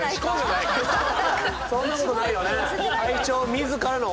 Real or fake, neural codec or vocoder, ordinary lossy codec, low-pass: real; none; none; none